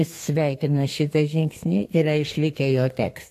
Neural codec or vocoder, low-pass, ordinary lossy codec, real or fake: codec, 32 kHz, 1.9 kbps, SNAC; 14.4 kHz; AAC, 64 kbps; fake